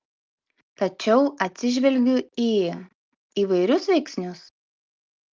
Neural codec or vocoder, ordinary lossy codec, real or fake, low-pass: none; Opus, 24 kbps; real; 7.2 kHz